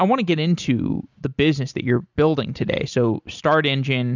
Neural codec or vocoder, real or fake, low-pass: none; real; 7.2 kHz